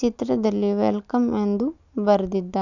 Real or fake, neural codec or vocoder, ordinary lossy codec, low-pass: real; none; none; 7.2 kHz